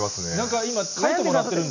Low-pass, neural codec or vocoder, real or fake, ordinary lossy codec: 7.2 kHz; none; real; none